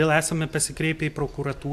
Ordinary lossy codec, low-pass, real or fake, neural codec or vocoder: AAC, 96 kbps; 14.4 kHz; real; none